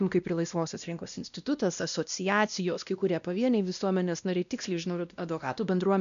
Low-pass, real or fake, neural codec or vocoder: 7.2 kHz; fake; codec, 16 kHz, 1 kbps, X-Codec, WavLM features, trained on Multilingual LibriSpeech